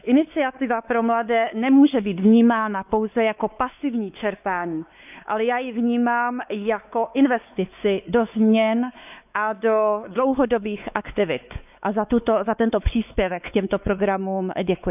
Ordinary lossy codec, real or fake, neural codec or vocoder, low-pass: none; fake; codec, 16 kHz, 4 kbps, X-Codec, WavLM features, trained on Multilingual LibriSpeech; 3.6 kHz